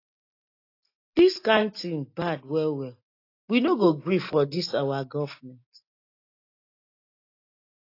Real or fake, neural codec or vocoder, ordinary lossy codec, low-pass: real; none; AAC, 32 kbps; 5.4 kHz